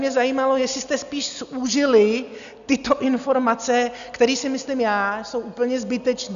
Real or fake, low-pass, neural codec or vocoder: real; 7.2 kHz; none